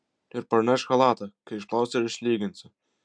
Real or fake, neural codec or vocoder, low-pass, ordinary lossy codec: real; none; 9.9 kHz; MP3, 96 kbps